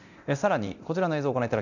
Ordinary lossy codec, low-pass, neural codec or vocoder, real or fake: none; 7.2 kHz; codec, 24 kHz, 0.9 kbps, DualCodec; fake